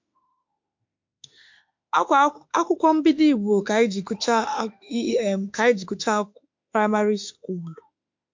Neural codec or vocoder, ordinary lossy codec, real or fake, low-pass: autoencoder, 48 kHz, 32 numbers a frame, DAC-VAE, trained on Japanese speech; MP3, 48 kbps; fake; 7.2 kHz